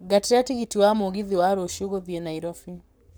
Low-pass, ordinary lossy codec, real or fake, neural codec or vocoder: none; none; fake; codec, 44.1 kHz, 7.8 kbps, Pupu-Codec